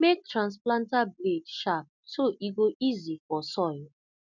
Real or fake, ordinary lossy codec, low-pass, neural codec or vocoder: real; none; 7.2 kHz; none